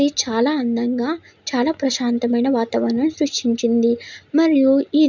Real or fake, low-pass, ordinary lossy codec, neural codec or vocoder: real; 7.2 kHz; none; none